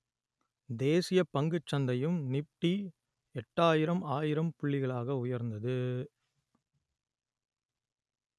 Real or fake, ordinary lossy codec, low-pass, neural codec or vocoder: real; none; none; none